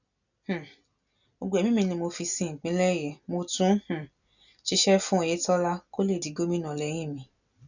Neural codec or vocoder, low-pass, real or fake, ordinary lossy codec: none; 7.2 kHz; real; none